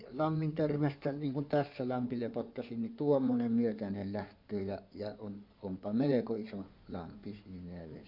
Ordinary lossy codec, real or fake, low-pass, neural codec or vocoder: none; fake; 5.4 kHz; codec, 16 kHz in and 24 kHz out, 2.2 kbps, FireRedTTS-2 codec